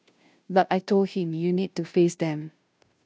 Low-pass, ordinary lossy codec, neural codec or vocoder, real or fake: none; none; codec, 16 kHz, 0.5 kbps, FunCodec, trained on Chinese and English, 25 frames a second; fake